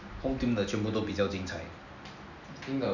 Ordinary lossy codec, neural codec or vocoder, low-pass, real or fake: none; none; 7.2 kHz; real